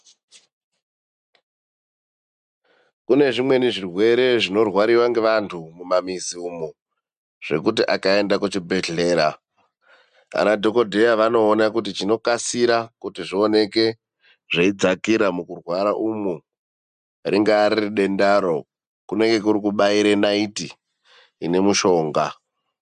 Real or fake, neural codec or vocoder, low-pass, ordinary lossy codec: real; none; 10.8 kHz; MP3, 96 kbps